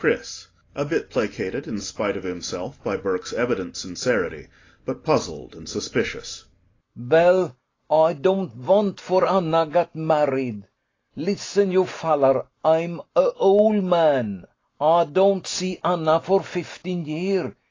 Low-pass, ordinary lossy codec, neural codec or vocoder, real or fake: 7.2 kHz; AAC, 32 kbps; none; real